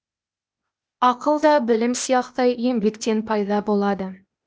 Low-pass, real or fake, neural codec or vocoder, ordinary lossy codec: none; fake; codec, 16 kHz, 0.8 kbps, ZipCodec; none